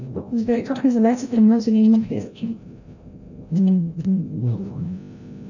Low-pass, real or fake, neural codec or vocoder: 7.2 kHz; fake; codec, 16 kHz, 0.5 kbps, FreqCodec, larger model